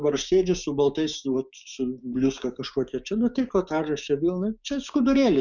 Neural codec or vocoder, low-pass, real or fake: codec, 16 kHz, 6 kbps, DAC; 7.2 kHz; fake